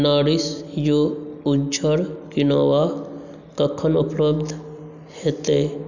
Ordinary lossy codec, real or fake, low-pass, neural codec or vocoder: none; real; 7.2 kHz; none